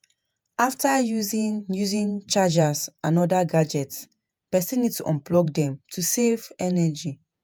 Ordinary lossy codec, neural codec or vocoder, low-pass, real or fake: none; vocoder, 48 kHz, 128 mel bands, Vocos; none; fake